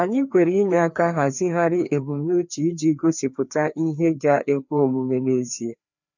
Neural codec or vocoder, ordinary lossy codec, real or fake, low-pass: codec, 16 kHz, 2 kbps, FreqCodec, larger model; none; fake; 7.2 kHz